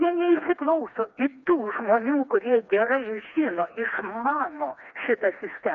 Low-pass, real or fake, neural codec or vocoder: 7.2 kHz; fake; codec, 16 kHz, 2 kbps, FreqCodec, smaller model